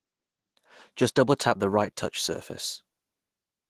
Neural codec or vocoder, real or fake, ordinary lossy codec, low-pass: autoencoder, 48 kHz, 128 numbers a frame, DAC-VAE, trained on Japanese speech; fake; Opus, 16 kbps; 14.4 kHz